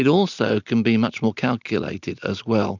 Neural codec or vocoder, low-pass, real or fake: none; 7.2 kHz; real